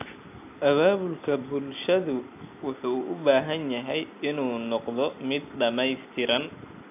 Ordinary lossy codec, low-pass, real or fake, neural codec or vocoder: AAC, 32 kbps; 3.6 kHz; real; none